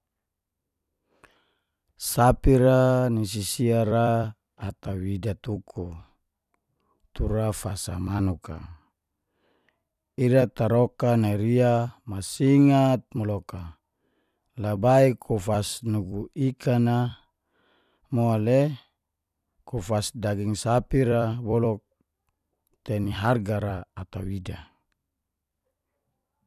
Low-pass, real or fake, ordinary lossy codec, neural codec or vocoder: 14.4 kHz; fake; none; vocoder, 44.1 kHz, 128 mel bands every 256 samples, BigVGAN v2